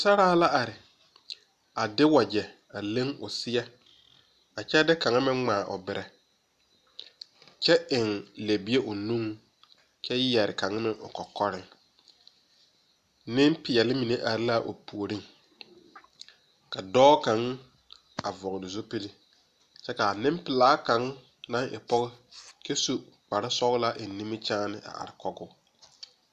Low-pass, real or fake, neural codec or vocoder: 14.4 kHz; real; none